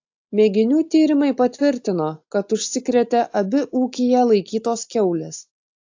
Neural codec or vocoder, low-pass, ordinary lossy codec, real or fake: none; 7.2 kHz; AAC, 48 kbps; real